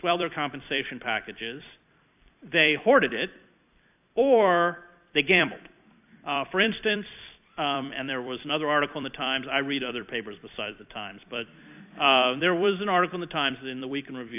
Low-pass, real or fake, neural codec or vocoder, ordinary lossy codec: 3.6 kHz; fake; vocoder, 44.1 kHz, 128 mel bands every 256 samples, BigVGAN v2; AAC, 32 kbps